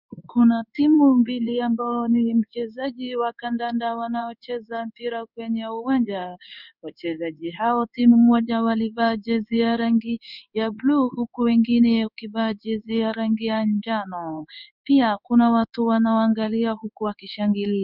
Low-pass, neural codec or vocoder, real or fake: 5.4 kHz; codec, 16 kHz in and 24 kHz out, 1 kbps, XY-Tokenizer; fake